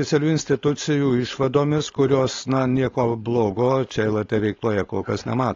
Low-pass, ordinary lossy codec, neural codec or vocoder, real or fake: 7.2 kHz; AAC, 32 kbps; codec, 16 kHz, 4.8 kbps, FACodec; fake